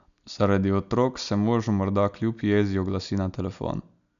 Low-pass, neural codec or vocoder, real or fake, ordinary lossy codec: 7.2 kHz; none; real; none